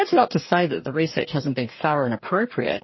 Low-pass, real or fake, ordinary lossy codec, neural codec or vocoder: 7.2 kHz; fake; MP3, 24 kbps; codec, 44.1 kHz, 2.6 kbps, DAC